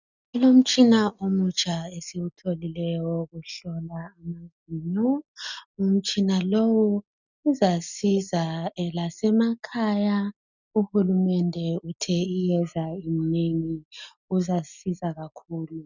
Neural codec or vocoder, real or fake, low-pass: none; real; 7.2 kHz